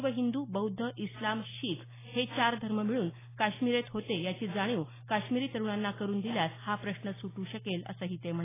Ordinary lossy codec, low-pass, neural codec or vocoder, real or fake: AAC, 16 kbps; 3.6 kHz; none; real